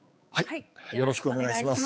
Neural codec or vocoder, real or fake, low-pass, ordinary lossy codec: codec, 16 kHz, 4 kbps, X-Codec, HuBERT features, trained on balanced general audio; fake; none; none